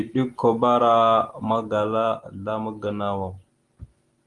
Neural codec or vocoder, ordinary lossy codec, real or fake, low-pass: none; Opus, 24 kbps; real; 10.8 kHz